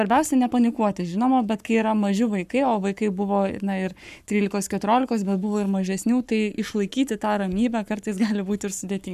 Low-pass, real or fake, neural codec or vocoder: 14.4 kHz; fake; codec, 44.1 kHz, 7.8 kbps, Pupu-Codec